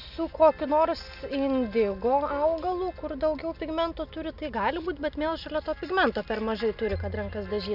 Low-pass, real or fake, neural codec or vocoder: 5.4 kHz; real; none